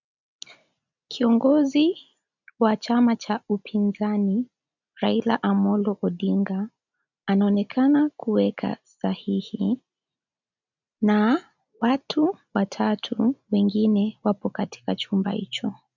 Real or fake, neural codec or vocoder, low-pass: real; none; 7.2 kHz